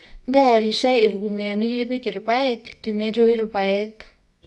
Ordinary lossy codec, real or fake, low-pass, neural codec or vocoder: none; fake; none; codec, 24 kHz, 0.9 kbps, WavTokenizer, medium music audio release